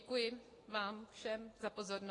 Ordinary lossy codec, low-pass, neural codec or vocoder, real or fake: AAC, 32 kbps; 10.8 kHz; none; real